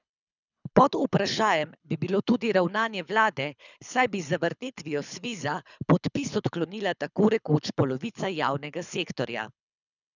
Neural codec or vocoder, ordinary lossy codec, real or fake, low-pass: codec, 24 kHz, 6 kbps, HILCodec; none; fake; 7.2 kHz